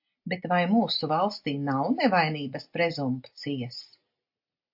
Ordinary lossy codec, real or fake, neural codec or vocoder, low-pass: AAC, 48 kbps; real; none; 5.4 kHz